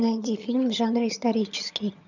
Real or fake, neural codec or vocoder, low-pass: fake; vocoder, 22.05 kHz, 80 mel bands, HiFi-GAN; 7.2 kHz